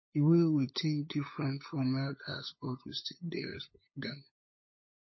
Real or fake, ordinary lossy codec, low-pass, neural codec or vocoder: fake; MP3, 24 kbps; 7.2 kHz; codec, 16 kHz, 8 kbps, FunCodec, trained on LibriTTS, 25 frames a second